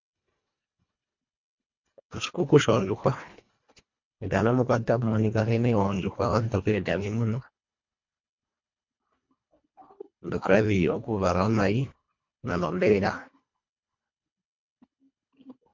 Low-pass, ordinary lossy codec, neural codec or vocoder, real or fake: 7.2 kHz; MP3, 48 kbps; codec, 24 kHz, 1.5 kbps, HILCodec; fake